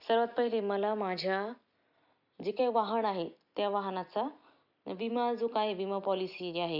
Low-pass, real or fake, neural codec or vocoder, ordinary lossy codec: 5.4 kHz; real; none; none